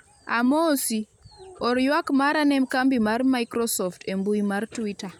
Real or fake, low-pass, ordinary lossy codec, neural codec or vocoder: real; 19.8 kHz; none; none